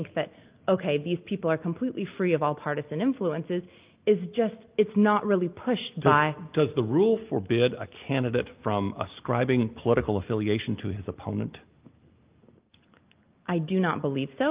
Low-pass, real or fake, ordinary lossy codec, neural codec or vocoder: 3.6 kHz; real; Opus, 24 kbps; none